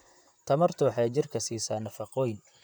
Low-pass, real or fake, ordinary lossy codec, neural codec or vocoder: none; fake; none; vocoder, 44.1 kHz, 128 mel bands, Pupu-Vocoder